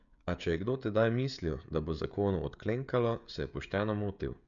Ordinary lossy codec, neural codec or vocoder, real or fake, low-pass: none; codec, 16 kHz, 16 kbps, FreqCodec, smaller model; fake; 7.2 kHz